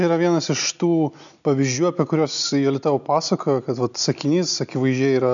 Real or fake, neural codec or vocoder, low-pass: real; none; 7.2 kHz